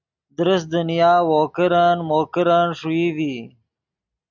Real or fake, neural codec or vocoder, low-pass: real; none; 7.2 kHz